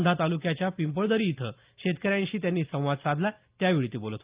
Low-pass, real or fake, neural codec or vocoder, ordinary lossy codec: 3.6 kHz; real; none; Opus, 32 kbps